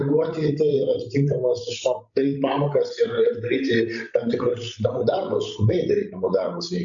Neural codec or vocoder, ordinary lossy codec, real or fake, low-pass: codec, 16 kHz, 16 kbps, FreqCodec, larger model; AAC, 64 kbps; fake; 7.2 kHz